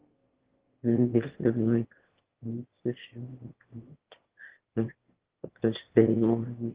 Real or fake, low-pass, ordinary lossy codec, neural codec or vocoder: fake; 3.6 kHz; Opus, 16 kbps; autoencoder, 22.05 kHz, a latent of 192 numbers a frame, VITS, trained on one speaker